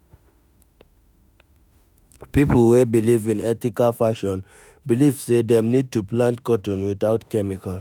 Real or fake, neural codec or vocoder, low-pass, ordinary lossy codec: fake; autoencoder, 48 kHz, 32 numbers a frame, DAC-VAE, trained on Japanese speech; 19.8 kHz; none